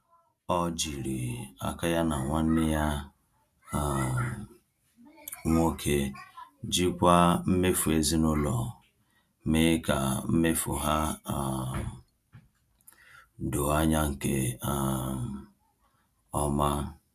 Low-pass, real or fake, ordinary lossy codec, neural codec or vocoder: 14.4 kHz; real; none; none